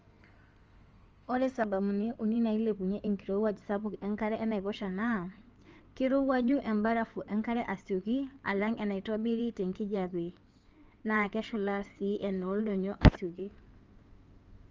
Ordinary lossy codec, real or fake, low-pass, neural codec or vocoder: Opus, 24 kbps; fake; 7.2 kHz; codec, 16 kHz in and 24 kHz out, 2.2 kbps, FireRedTTS-2 codec